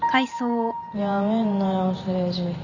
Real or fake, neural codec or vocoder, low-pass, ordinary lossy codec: fake; vocoder, 44.1 kHz, 128 mel bands every 512 samples, BigVGAN v2; 7.2 kHz; none